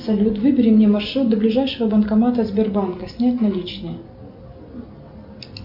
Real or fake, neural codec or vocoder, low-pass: real; none; 5.4 kHz